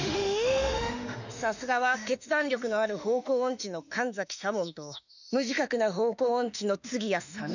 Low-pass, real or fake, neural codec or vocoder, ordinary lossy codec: 7.2 kHz; fake; autoencoder, 48 kHz, 32 numbers a frame, DAC-VAE, trained on Japanese speech; none